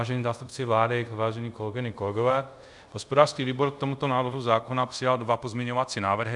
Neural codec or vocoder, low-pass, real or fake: codec, 24 kHz, 0.5 kbps, DualCodec; 10.8 kHz; fake